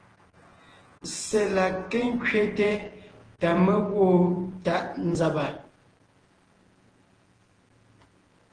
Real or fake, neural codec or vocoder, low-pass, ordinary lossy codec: fake; vocoder, 48 kHz, 128 mel bands, Vocos; 9.9 kHz; Opus, 16 kbps